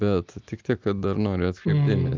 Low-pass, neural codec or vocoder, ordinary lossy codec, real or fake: 7.2 kHz; none; Opus, 32 kbps; real